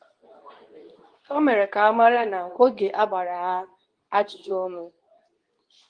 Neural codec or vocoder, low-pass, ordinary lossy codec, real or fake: codec, 24 kHz, 0.9 kbps, WavTokenizer, medium speech release version 2; 9.9 kHz; Opus, 24 kbps; fake